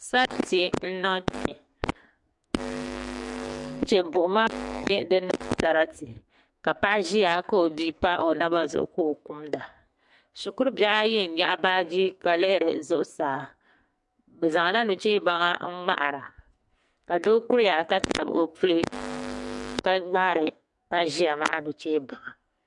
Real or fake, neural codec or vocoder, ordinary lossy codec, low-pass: fake; codec, 44.1 kHz, 2.6 kbps, SNAC; MP3, 64 kbps; 10.8 kHz